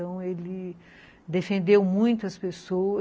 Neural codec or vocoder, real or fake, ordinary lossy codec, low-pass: none; real; none; none